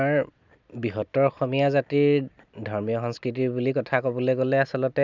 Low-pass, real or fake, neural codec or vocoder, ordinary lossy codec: 7.2 kHz; real; none; none